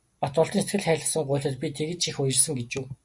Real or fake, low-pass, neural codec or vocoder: real; 10.8 kHz; none